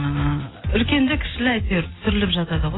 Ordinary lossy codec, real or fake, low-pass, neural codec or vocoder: AAC, 16 kbps; real; 7.2 kHz; none